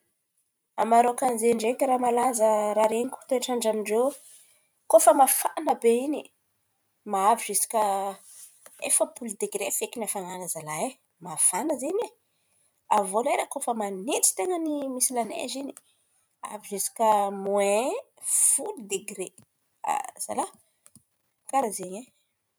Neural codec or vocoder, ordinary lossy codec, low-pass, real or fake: vocoder, 44.1 kHz, 128 mel bands every 512 samples, BigVGAN v2; none; none; fake